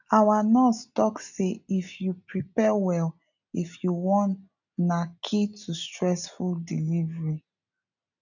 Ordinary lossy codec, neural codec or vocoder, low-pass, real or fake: none; none; 7.2 kHz; real